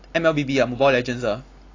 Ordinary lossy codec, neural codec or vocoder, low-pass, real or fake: AAC, 32 kbps; none; 7.2 kHz; real